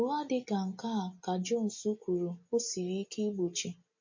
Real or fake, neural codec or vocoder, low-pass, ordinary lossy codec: real; none; 7.2 kHz; MP3, 32 kbps